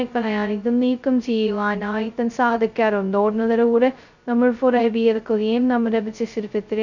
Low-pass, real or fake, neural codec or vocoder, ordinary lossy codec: 7.2 kHz; fake; codec, 16 kHz, 0.2 kbps, FocalCodec; none